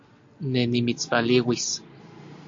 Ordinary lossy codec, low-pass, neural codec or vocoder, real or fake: AAC, 48 kbps; 7.2 kHz; none; real